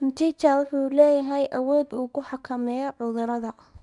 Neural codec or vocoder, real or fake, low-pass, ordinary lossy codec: codec, 24 kHz, 0.9 kbps, WavTokenizer, small release; fake; 10.8 kHz; none